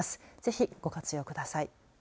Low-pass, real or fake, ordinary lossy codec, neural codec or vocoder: none; real; none; none